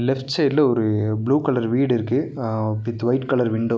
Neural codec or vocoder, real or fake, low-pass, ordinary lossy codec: none; real; none; none